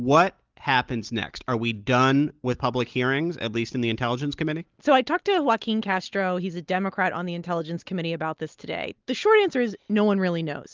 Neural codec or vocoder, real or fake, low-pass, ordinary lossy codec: none; real; 7.2 kHz; Opus, 32 kbps